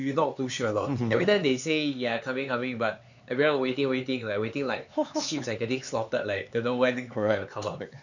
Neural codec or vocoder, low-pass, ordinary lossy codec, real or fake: codec, 16 kHz, 4 kbps, X-Codec, HuBERT features, trained on LibriSpeech; 7.2 kHz; none; fake